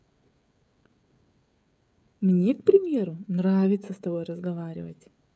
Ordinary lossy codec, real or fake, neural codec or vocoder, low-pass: none; fake; codec, 16 kHz, 16 kbps, FreqCodec, smaller model; none